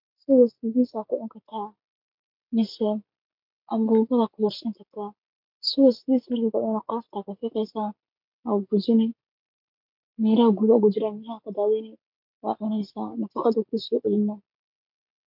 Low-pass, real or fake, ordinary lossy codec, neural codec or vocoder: 5.4 kHz; real; MP3, 48 kbps; none